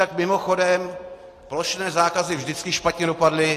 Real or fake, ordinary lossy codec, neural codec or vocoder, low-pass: real; AAC, 48 kbps; none; 14.4 kHz